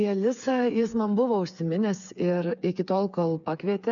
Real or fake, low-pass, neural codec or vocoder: fake; 7.2 kHz; codec, 16 kHz, 8 kbps, FreqCodec, smaller model